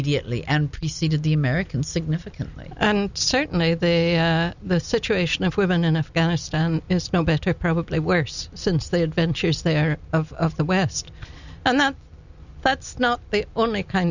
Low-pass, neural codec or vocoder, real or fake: 7.2 kHz; none; real